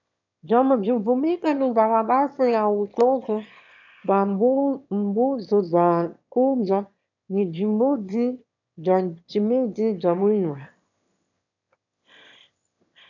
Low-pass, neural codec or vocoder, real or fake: 7.2 kHz; autoencoder, 22.05 kHz, a latent of 192 numbers a frame, VITS, trained on one speaker; fake